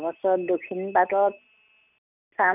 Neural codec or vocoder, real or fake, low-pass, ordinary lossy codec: none; real; 3.6 kHz; none